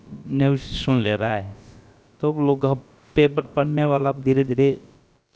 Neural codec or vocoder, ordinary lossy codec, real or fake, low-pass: codec, 16 kHz, about 1 kbps, DyCAST, with the encoder's durations; none; fake; none